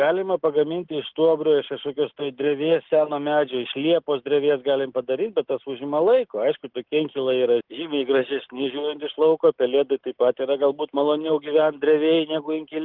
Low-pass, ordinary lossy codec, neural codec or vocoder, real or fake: 5.4 kHz; Opus, 16 kbps; none; real